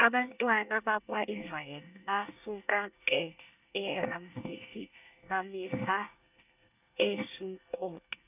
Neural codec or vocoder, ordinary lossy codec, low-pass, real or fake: codec, 24 kHz, 1 kbps, SNAC; none; 3.6 kHz; fake